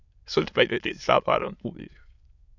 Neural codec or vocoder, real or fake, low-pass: autoencoder, 22.05 kHz, a latent of 192 numbers a frame, VITS, trained on many speakers; fake; 7.2 kHz